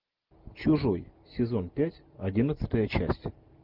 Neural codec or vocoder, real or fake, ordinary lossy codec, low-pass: none; real; Opus, 24 kbps; 5.4 kHz